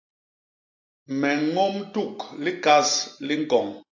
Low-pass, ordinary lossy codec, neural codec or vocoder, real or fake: 7.2 kHz; AAC, 48 kbps; none; real